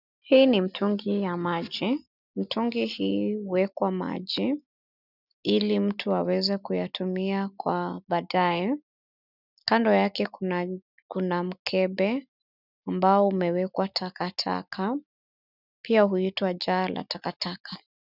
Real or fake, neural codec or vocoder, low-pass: real; none; 5.4 kHz